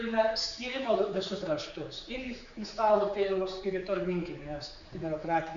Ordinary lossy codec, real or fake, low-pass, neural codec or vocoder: MP3, 64 kbps; fake; 7.2 kHz; codec, 16 kHz, 4 kbps, X-Codec, HuBERT features, trained on general audio